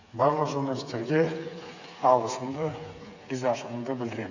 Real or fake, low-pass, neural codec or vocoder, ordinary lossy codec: fake; 7.2 kHz; codec, 16 kHz, 8 kbps, FreqCodec, smaller model; none